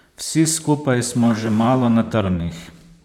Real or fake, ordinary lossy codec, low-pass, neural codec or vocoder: fake; none; 19.8 kHz; vocoder, 44.1 kHz, 128 mel bands, Pupu-Vocoder